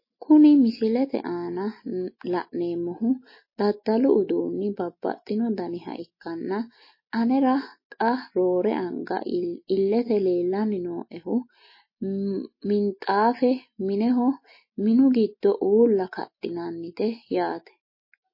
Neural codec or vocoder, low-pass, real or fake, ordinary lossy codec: none; 5.4 kHz; real; MP3, 24 kbps